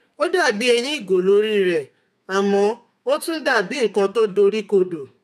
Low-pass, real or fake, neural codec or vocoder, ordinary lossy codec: 14.4 kHz; fake; codec, 32 kHz, 1.9 kbps, SNAC; none